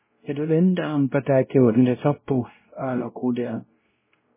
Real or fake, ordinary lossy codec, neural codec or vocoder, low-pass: fake; MP3, 16 kbps; codec, 16 kHz, 0.5 kbps, X-Codec, WavLM features, trained on Multilingual LibriSpeech; 3.6 kHz